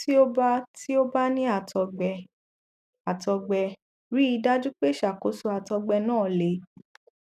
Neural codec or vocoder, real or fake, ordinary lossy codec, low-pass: none; real; none; 14.4 kHz